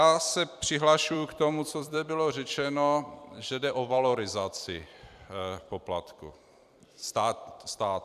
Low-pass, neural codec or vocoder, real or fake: 14.4 kHz; vocoder, 44.1 kHz, 128 mel bands every 256 samples, BigVGAN v2; fake